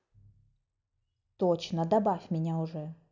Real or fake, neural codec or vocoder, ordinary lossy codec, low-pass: real; none; none; 7.2 kHz